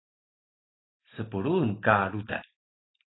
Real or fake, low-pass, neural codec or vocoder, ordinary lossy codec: real; 7.2 kHz; none; AAC, 16 kbps